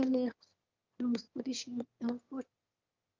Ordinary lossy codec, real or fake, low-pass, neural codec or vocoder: Opus, 24 kbps; fake; 7.2 kHz; autoencoder, 22.05 kHz, a latent of 192 numbers a frame, VITS, trained on one speaker